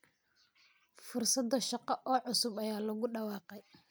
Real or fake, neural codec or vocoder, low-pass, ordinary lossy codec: real; none; none; none